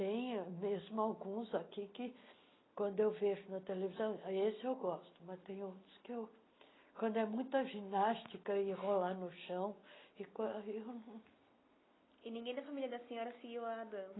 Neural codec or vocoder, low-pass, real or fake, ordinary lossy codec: none; 7.2 kHz; real; AAC, 16 kbps